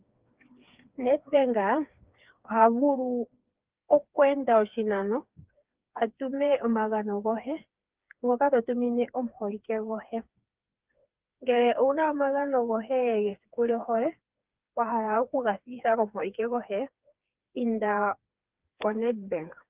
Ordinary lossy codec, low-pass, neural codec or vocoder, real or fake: Opus, 32 kbps; 3.6 kHz; codec, 16 kHz, 4 kbps, FreqCodec, smaller model; fake